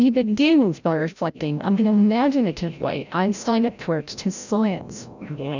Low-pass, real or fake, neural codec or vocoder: 7.2 kHz; fake; codec, 16 kHz, 0.5 kbps, FreqCodec, larger model